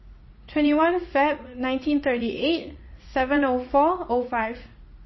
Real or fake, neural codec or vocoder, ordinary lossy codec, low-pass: fake; vocoder, 44.1 kHz, 80 mel bands, Vocos; MP3, 24 kbps; 7.2 kHz